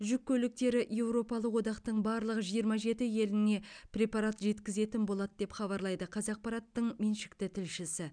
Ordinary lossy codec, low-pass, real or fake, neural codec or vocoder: none; 9.9 kHz; real; none